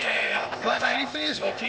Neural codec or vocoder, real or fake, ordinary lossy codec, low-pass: codec, 16 kHz, 0.8 kbps, ZipCodec; fake; none; none